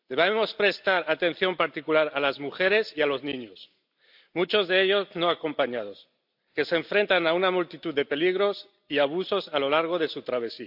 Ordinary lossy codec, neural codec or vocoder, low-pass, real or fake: AAC, 48 kbps; none; 5.4 kHz; real